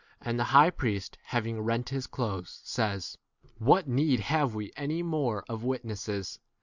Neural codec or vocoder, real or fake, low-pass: none; real; 7.2 kHz